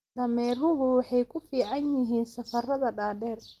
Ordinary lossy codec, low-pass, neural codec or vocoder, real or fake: Opus, 24 kbps; 14.4 kHz; none; real